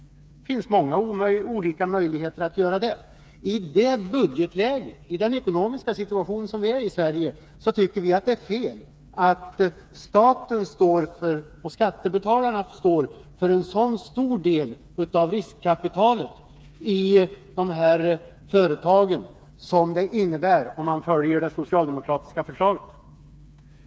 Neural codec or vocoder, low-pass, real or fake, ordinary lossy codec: codec, 16 kHz, 4 kbps, FreqCodec, smaller model; none; fake; none